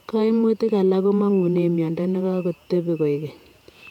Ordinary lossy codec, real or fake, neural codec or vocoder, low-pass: none; fake; vocoder, 48 kHz, 128 mel bands, Vocos; 19.8 kHz